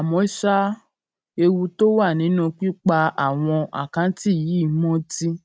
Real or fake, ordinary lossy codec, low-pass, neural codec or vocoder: real; none; none; none